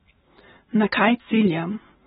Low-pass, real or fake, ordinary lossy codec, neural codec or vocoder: 19.8 kHz; real; AAC, 16 kbps; none